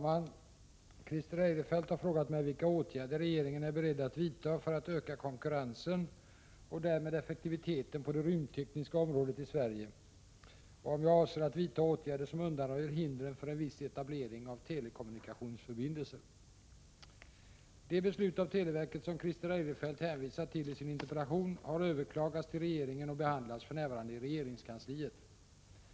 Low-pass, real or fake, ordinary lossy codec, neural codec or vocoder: none; real; none; none